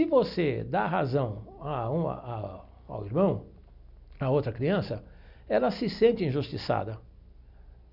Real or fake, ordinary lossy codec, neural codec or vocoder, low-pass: real; none; none; 5.4 kHz